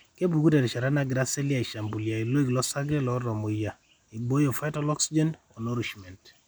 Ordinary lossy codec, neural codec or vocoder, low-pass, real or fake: none; none; none; real